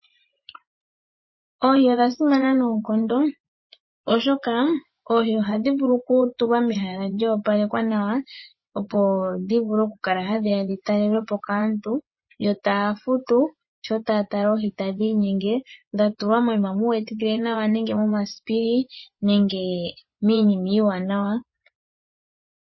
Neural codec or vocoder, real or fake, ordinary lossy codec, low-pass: vocoder, 24 kHz, 100 mel bands, Vocos; fake; MP3, 24 kbps; 7.2 kHz